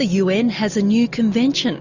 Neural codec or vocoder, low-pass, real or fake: none; 7.2 kHz; real